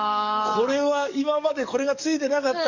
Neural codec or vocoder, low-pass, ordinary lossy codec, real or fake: codec, 44.1 kHz, 7.8 kbps, DAC; 7.2 kHz; none; fake